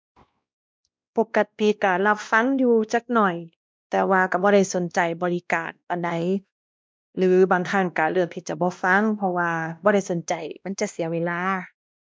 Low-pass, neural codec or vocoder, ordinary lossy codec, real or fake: none; codec, 16 kHz, 1 kbps, X-Codec, WavLM features, trained on Multilingual LibriSpeech; none; fake